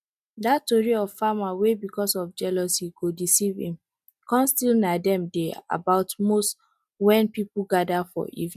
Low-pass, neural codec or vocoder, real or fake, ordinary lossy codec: 14.4 kHz; none; real; none